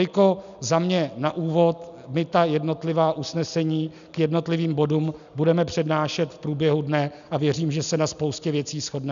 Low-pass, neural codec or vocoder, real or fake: 7.2 kHz; none; real